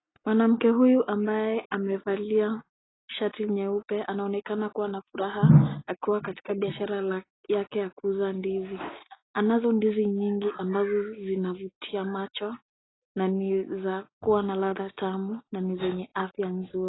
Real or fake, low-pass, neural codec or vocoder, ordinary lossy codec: real; 7.2 kHz; none; AAC, 16 kbps